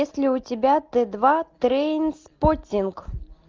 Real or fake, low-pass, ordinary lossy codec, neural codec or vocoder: real; 7.2 kHz; Opus, 32 kbps; none